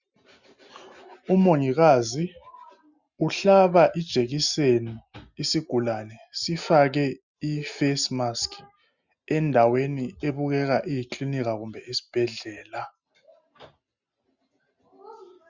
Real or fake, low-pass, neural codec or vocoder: real; 7.2 kHz; none